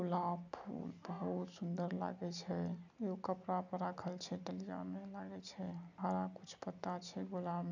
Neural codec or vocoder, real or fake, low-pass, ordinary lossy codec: none; real; 7.2 kHz; none